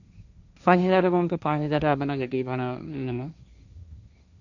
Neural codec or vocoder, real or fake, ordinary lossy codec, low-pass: codec, 16 kHz, 1.1 kbps, Voila-Tokenizer; fake; none; 7.2 kHz